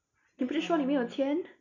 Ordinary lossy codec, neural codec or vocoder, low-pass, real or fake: none; none; 7.2 kHz; real